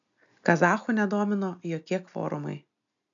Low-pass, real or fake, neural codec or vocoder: 7.2 kHz; real; none